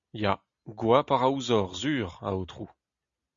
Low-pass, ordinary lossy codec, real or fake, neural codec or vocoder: 7.2 kHz; Opus, 64 kbps; real; none